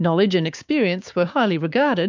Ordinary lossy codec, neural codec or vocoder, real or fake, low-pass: MP3, 64 kbps; codec, 16 kHz, 6 kbps, DAC; fake; 7.2 kHz